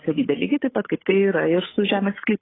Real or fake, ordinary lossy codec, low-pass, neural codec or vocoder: fake; AAC, 16 kbps; 7.2 kHz; codec, 16 kHz, 8 kbps, FunCodec, trained on Chinese and English, 25 frames a second